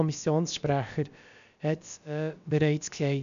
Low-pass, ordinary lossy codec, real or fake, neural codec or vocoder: 7.2 kHz; none; fake; codec, 16 kHz, about 1 kbps, DyCAST, with the encoder's durations